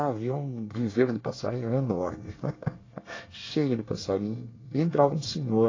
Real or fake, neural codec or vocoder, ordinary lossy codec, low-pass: fake; codec, 24 kHz, 1 kbps, SNAC; AAC, 32 kbps; 7.2 kHz